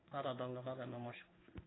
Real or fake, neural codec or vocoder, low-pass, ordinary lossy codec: fake; codec, 16 kHz in and 24 kHz out, 1 kbps, XY-Tokenizer; 7.2 kHz; AAC, 16 kbps